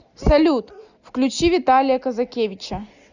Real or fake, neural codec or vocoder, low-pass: real; none; 7.2 kHz